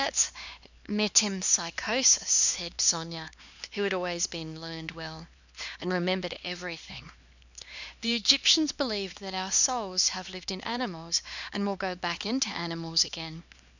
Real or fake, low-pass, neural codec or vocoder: fake; 7.2 kHz; codec, 16 kHz, 2 kbps, X-Codec, HuBERT features, trained on LibriSpeech